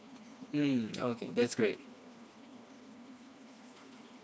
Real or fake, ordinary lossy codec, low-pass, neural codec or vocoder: fake; none; none; codec, 16 kHz, 2 kbps, FreqCodec, smaller model